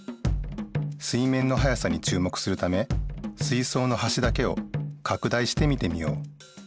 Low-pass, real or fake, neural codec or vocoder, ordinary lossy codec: none; real; none; none